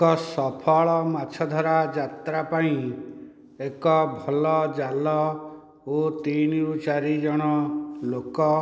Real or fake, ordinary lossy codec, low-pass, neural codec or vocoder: real; none; none; none